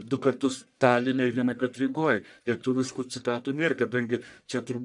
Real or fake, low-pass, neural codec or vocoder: fake; 10.8 kHz; codec, 44.1 kHz, 1.7 kbps, Pupu-Codec